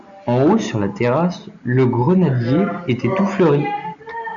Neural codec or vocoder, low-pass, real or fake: none; 7.2 kHz; real